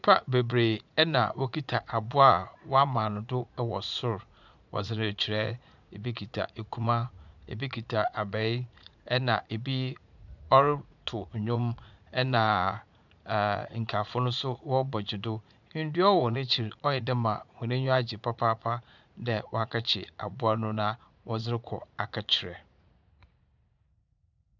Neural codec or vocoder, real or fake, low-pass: vocoder, 44.1 kHz, 80 mel bands, Vocos; fake; 7.2 kHz